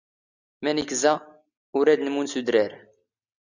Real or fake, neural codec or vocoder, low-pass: real; none; 7.2 kHz